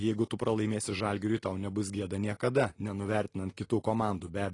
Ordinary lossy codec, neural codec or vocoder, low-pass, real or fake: AAC, 32 kbps; none; 10.8 kHz; real